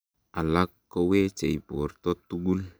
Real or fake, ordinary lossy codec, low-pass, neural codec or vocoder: real; none; none; none